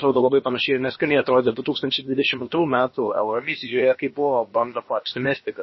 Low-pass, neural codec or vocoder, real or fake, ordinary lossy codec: 7.2 kHz; codec, 16 kHz, about 1 kbps, DyCAST, with the encoder's durations; fake; MP3, 24 kbps